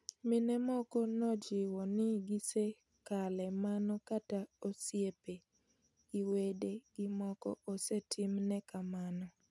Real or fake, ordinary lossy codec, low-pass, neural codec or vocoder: real; none; none; none